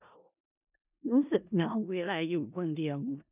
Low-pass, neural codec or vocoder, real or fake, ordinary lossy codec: 3.6 kHz; codec, 16 kHz in and 24 kHz out, 0.4 kbps, LongCat-Audio-Codec, four codebook decoder; fake; none